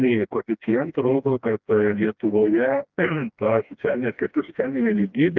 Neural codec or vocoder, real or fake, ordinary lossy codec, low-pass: codec, 16 kHz, 1 kbps, FreqCodec, smaller model; fake; Opus, 32 kbps; 7.2 kHz